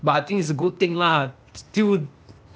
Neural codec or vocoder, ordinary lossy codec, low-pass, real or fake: codec, 16 kHz, 0.8 kbps, ZipCodec; none; none; fake